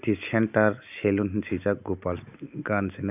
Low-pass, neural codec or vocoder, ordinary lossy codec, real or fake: 3.6 kHz; none; none; real